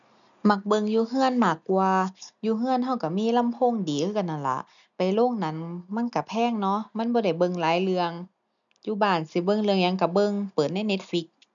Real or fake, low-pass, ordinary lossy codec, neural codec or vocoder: real; 7.2 kHz; none; none